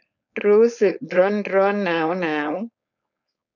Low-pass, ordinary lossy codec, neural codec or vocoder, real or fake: 7.2 kHz; none; codec, 16 kHz, 4.8 kbps, FACodec; fake